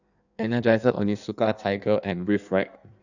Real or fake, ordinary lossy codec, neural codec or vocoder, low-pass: fake; none; codec, 16 kHz in and 24 kHz out, 1.1 kbps, FireRedTTS-2 codec; 7.2 kHz